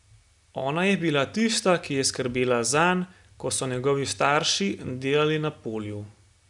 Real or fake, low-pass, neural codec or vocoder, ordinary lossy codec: real; 10.8 kHz; none; none